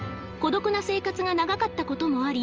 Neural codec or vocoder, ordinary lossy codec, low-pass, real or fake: none; Opus, 24 kbps; 7.2 kHz; real